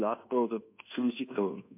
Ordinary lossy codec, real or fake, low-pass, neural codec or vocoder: MP3, 32 kbps; fake; 3.6 kHz; codec, 24 kHz, 1.2 kbps, DualCodec